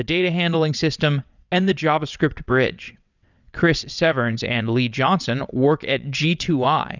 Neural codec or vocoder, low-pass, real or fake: vocoder, 22.05 kHz, 80 mel bands, WaveNeXt; 7.2 kHz; fake